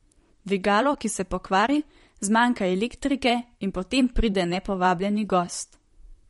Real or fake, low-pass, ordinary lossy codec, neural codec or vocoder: fake; 19.8 kHz; MP3, 48 kbps; vocoder, 44.1 kHz, 128 mel bands, Pupu-Vocoder